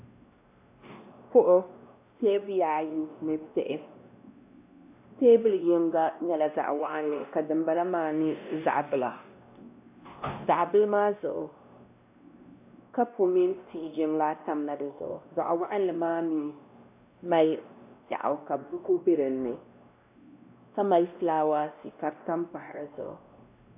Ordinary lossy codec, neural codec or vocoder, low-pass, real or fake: AAC, 32 kbps; codec, 16 kHz, 1 kbps, X-Codec, WavLM features, trained on Multilingual LibriSpeech; 3.6 kHz; fake